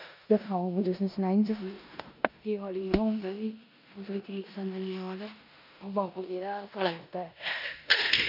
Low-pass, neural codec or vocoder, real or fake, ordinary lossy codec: 5.4 kHz; codec, 16 kHz in and 24 kHz out, 0.9 kbps, LongCat-Audio-Codec, four codebook decoder; fake; none